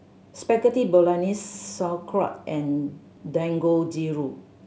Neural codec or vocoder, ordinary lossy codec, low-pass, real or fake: none; none; none; real